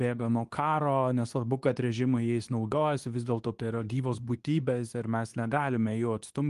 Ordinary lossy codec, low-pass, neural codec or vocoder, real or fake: Opus, 24 kbps; 10.8 kHz; codec, 24 kHz, 0.9 kbps, WavTokenizer, medium speech release version 2; fake